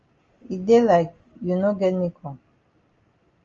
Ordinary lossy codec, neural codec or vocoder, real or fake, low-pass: Opus, 32 kbps; none; real; 7.2 kHz